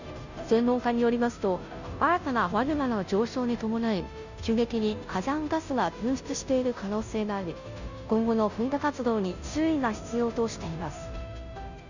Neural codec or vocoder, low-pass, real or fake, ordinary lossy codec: codec, 16 kHz, 0.5 kbps, FunCodec, trained on Chinese and English, 25 frames a second; 7.2 kHz; fake; none